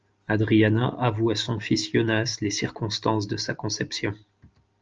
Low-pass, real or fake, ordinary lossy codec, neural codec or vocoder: 7.2 kHz; real; Opus, 24 kbps; none